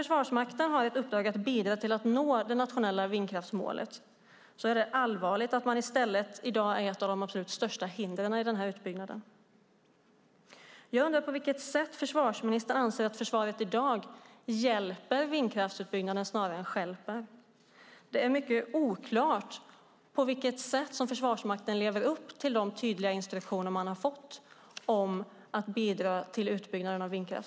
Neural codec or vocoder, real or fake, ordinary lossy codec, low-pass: none; real; none; none